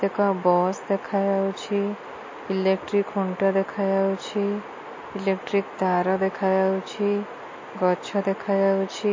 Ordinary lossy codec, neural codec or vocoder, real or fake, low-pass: MP3, 32 kbps; none; real; 7.2 kHz